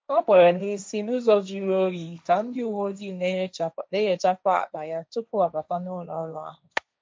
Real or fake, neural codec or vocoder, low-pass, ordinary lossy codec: fake; codec, 16 kHz, 1.1 kbps, Voila-Tokenizer; none; none